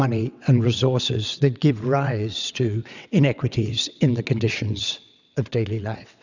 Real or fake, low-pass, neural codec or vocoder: fake; 7.2 kHz; vocoder, 22.05 kHz, 80 mel bands, WaveNeXt